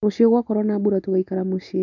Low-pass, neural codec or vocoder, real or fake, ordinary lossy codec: 7.2 kHz; none; real; none